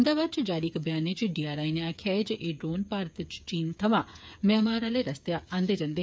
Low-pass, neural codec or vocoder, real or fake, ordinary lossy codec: none; codec, 16 kHz, 8 kbps, FreqCodec, smaller model; fake; none